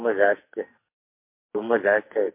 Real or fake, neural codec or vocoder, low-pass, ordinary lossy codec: fake; codec, 44.1 kHz, 2.6 kbps, SNAC; 3.6 kHz; MP3, 24 kbps